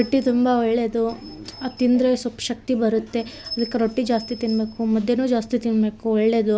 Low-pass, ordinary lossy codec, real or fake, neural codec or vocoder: none; none; real; none